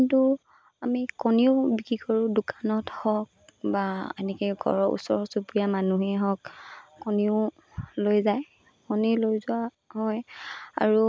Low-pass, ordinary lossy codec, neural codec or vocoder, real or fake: none; none; none; real